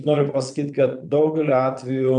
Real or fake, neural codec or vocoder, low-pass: fake; vocoder, 22.05 kHz, 80 mel bands, Vocos; 9.9 kHz